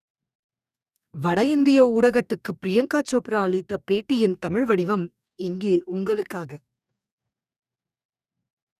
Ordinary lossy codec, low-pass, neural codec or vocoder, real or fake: none; 14.4 kHz; codec, 44.1 kHz, 2.6 kbps, DAC; fake